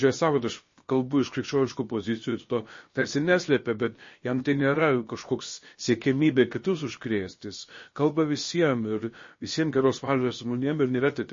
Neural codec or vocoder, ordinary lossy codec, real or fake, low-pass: codec, 16 kHz, about 1 kbps, DyCAST, with the encoder's durations; MP3, 32 kbps; fake; 7.2 kHz